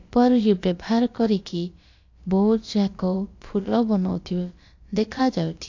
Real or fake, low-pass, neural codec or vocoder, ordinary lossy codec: fake; 7.2 kHz; codec, 16 kHz, about 1 kbps, DyCAST, with the encoder's durations; AAC, 48 kbps